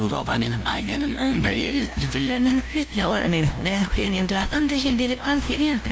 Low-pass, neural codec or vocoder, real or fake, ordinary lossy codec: none; codec, 16 kHz, 0.5 kbps, FunCodec, trained on LibriTTS, 25 frames a second; fake; none